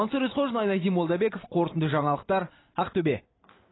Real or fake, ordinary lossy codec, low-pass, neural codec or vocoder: real; AAC, 16 kbps; 7.2 kHz; none